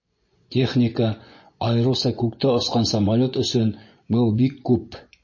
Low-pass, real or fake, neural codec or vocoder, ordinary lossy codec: 7.2 kHz; fake; vocoder, 24 kHz, 100 mel bands, Vocos; MP3, 32 kbps